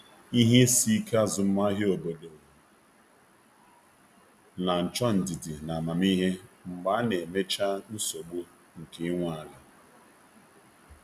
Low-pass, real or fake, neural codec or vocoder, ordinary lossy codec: 14.4 kHz; real; none; none